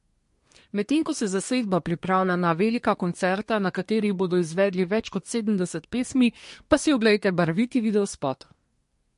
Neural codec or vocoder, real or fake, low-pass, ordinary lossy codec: codec, 24 kHz, 1 kbps, SNAC; fake; 10.8 kHz; MP3, 48 kbps